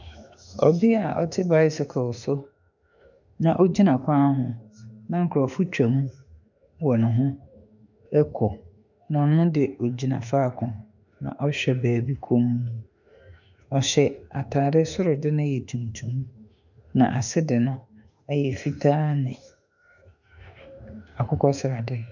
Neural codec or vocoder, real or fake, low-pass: autoencoder, 48 kHz, 32 numbers a frame, DAC-VAE, trained on Japanese speech; fake; 7.2 kHz